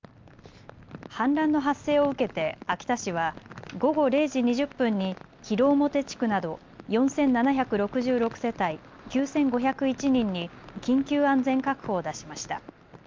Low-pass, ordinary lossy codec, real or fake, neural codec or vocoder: 7.2 kHz; Opus, 24 kbps; real; none